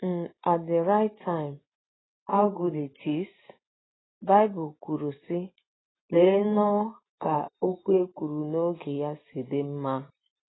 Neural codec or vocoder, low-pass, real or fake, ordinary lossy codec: none; 7.2 kHz; real; AAC, 16 kbps